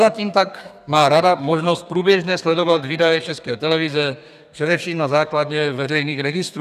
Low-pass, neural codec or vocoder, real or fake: 14.4 kHz; codec, 32 kHz, 1.9 kbps, SNAC; fake